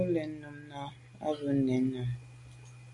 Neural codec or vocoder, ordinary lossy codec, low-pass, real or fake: none; AAC, 64 kbps; 10.8 kHz; real